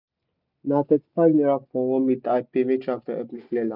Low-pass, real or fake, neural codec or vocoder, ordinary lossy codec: 5.4 kHz; real; none; none